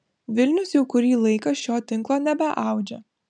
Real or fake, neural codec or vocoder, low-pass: real; none; 9.9 kHz